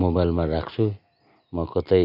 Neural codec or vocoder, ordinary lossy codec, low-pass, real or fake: none; none; 5.4 kHz; real